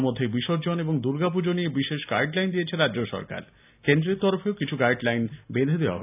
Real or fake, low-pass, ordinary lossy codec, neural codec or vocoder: real; 3.6 kHz; none; none